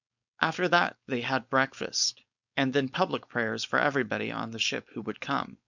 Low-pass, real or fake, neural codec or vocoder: 7.2 kHz; fake; codec, 16 kHz, 4.8 kbps, FACodec